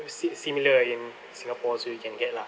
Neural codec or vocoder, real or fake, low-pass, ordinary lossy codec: none; real; none; none